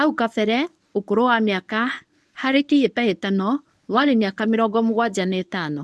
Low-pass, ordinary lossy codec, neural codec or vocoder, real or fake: none; none; codec, 24 kHz, 0.9 kbps, WavTokenizer, medium speech release version 1; fake